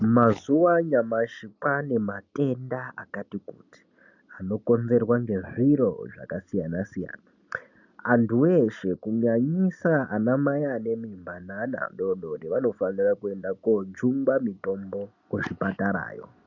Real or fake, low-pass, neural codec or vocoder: real; 7.2 kHz; none